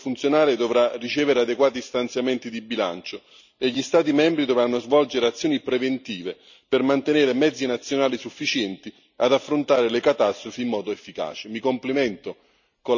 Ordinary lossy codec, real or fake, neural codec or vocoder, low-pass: none; real; none; 7.2 kHz